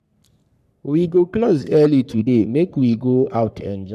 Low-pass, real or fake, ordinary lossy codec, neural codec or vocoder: 14.4 kHz; fake; none; codec, 44.1 kHz, 3.4 kbps, Pupu-Codec